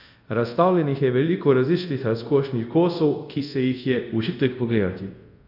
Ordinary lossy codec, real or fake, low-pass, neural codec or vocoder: none; fake; 5.4 kHz; codec, 24 kHz, 0.5 kbps, DualCodec